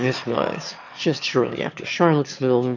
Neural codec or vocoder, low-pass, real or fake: autoencoder, 22.05 kHz, a latent of 192 numbers a frame, VITS, trained on one speaker; 7.2 kHz; fake